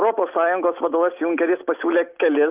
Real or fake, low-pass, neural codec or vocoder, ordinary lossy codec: real; 3.6 kHz; none; Opus, 32 kbps